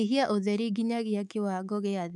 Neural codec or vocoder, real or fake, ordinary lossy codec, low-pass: codec, 24 kHz, 3.1 kbps, DualCodec; fake; none; none